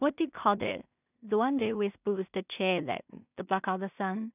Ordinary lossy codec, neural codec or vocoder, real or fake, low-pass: none; codec, 16 kHz in and 24 kHz out, 0.4 kbps, LongCat-Audio-Codec, two codebook decoder; fake; 3.6 kHz